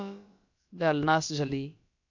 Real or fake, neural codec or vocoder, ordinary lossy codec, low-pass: fake; codec, 16 kHz, about 1 kbps, DyCAST, with the encoder's durations; MP3, 64 kbps; 7.2 kHz